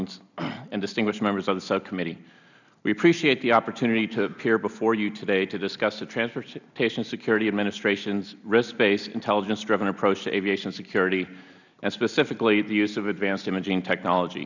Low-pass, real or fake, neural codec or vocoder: 7.2 kHz; real; none